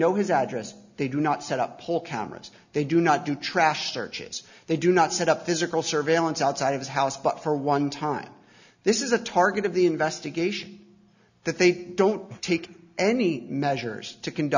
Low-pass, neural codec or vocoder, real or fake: 7.2 kHz; none; real